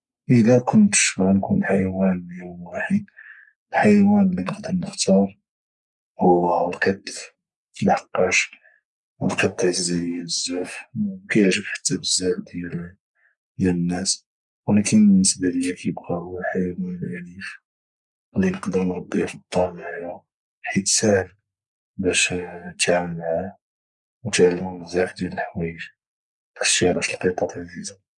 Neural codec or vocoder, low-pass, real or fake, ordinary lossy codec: codec, 44.1 kHz, 3.4 kbps, Pupu-Codec; 10.8 kHz; fake; none